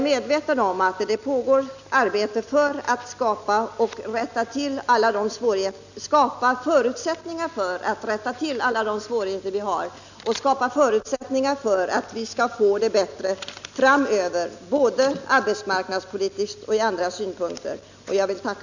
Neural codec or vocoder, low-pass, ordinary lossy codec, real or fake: none; 7.2 kHz; none; real